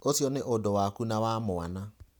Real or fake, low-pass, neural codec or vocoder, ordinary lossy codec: real; none; none; none